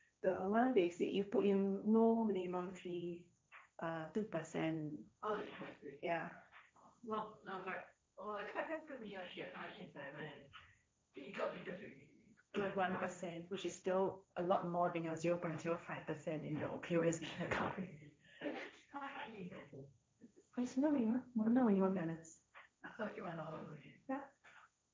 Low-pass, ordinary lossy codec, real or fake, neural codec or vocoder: none; none; fake; codec, 16 kHz, 1.1 kbps, Voila-Tokenizer